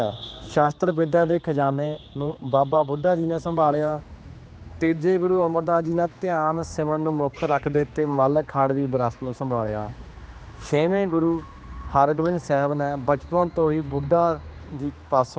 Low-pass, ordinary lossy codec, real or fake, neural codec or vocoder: none; none; fake; codec, 16 kHz, 2 kbps, X-Codec, HuBERT features, trained on general audio